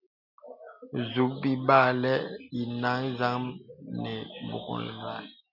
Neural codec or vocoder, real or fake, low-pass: none; real; 5.4 kHz